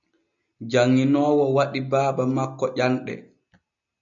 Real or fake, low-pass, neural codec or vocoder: real; 7.2 kHz; none